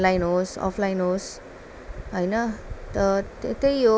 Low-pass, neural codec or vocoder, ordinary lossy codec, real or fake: none; none; none; real